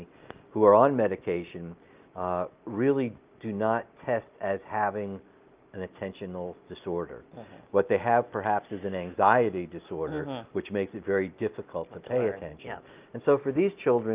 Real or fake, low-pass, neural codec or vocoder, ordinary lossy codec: real; 3.6 kHz; none; Opus, 24 kbps